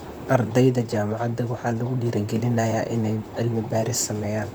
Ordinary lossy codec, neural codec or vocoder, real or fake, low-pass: none; vocoder, 44.1 kHz, 128 mel bands, Pupu-Vocoder; fake; none